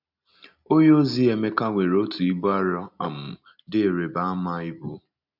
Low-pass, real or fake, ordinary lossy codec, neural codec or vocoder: 5.4 kHz; real; none; none